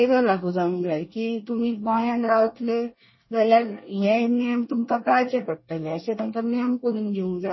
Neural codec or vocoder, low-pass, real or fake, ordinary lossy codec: codec, 24 kHz, 1 kbps, SNAC; 7.2 kHz; fake; MP3, 24 kbps